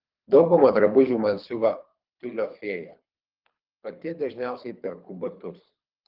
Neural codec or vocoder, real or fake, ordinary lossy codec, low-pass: codec, 24 kHz, 3 kbps, HILCodec; fake; Opus, 32 kbps; 5.4 kHz